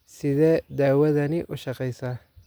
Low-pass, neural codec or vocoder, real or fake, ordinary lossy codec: none; none; real; none